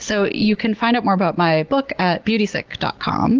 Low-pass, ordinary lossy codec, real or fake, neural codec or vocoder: 7.2 kHz; Opus, 16 kbps; real; none